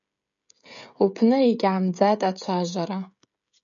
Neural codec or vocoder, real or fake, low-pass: codec, 16 kHz, 16 kbps, FreqCodec, smaller model; fake; 7.2 kHz